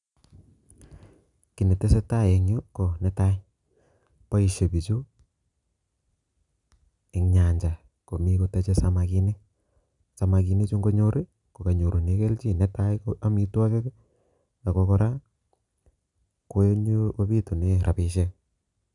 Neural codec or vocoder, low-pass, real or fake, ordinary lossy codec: none; 10.8 kHz; real; none